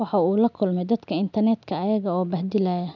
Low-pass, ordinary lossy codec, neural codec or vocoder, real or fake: 7.2 kHz; none; none; real